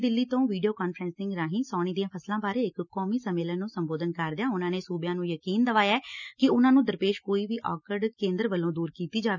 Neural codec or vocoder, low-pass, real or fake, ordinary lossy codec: none; 7.2 kHz; real; none